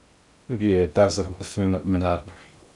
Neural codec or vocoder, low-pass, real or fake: codec, 16 kHz in and 24 kHz out, 0.6 kbps, FocalCodec, streaming, 2048 codes; 10.8 kHz; fake